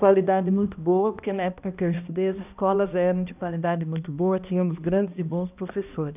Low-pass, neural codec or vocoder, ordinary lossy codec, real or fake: 3.6 kHz; codec, 16 kHz, 1 kbps, X-Codec, HuBERT features, trained on balanced general audio; none; fake